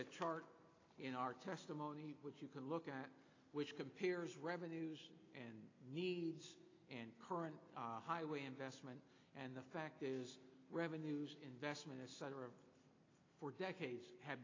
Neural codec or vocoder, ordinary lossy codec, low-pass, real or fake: none; AAC, 32 kbps; 7.2 kHz; real